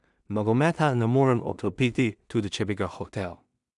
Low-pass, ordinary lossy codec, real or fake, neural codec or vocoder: 10.8 kHz; none; fake; codec, 16 kHz in and 24 kHz out, 0.4 kbps, LongCat-Audio-Codec, two codebook decoder